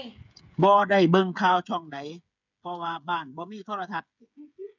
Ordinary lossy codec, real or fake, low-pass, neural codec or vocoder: none; fake; 7.2 kHz; codec, 16 kHz, 8 kbps, FreqCodec, smaller model